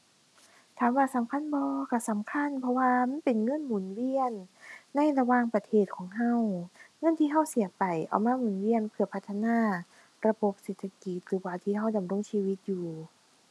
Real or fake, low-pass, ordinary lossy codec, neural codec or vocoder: real; none; none; none